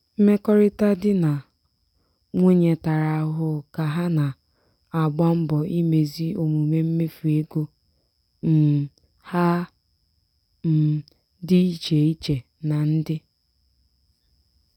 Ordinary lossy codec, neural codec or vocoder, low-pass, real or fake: none; none; 19.8 kHz; real